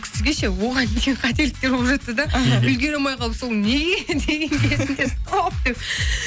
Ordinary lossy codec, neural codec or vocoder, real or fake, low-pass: none; none; real; none